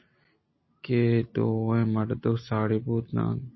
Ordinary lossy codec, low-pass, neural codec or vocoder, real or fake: MP3, 24 kbps; 7.2 kHz; none; real